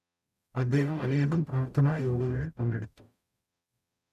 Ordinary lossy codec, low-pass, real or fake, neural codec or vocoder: none; 14.4 kHz; fake; codec, 44.1 kHz, 0.9 kbps, DAC